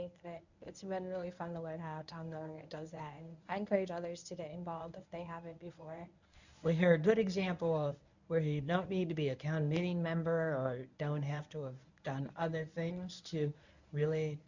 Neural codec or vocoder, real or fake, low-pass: codec, 24 kHz, 0.9 kbps, WavTokenizer, medium speech release version 1; fake; 7.2 kHz